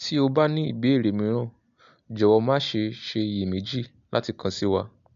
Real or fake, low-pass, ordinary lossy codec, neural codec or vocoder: real; 7.2 kHz; MP3, 64 kbps; none